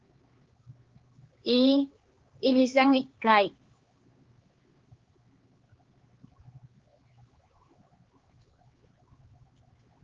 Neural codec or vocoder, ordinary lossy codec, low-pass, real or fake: codec, 16 kHz, 4 kbps, X-Codec, HuBERT features, trained on LibriSpeech; Opus, 16 kbps; 7.2 kHz; fake